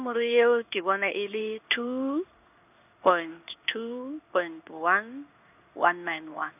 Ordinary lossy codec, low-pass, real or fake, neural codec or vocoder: none; 3.6 kHz; fake; codec, 16 kHz in and 24 kHz out, 1 kbps, XY-Tokenizer